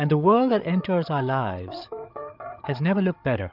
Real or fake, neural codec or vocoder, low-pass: fake; codec, 16 kHz, 8 kbps, FreqCodec, larger model; 5.4 kHz